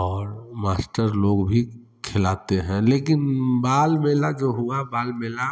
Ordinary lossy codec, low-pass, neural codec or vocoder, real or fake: none; none; none; real